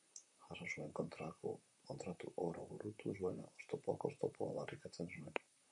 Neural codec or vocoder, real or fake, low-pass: vocoder, 44.1 kHz, 128 mel bands, Pupu-Vocoder; fake; 10.8 kHz